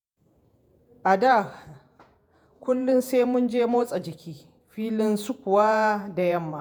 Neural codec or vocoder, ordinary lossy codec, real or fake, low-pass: vocoder, 48 kHz, 128 mel bands, Vocos; none; fake; none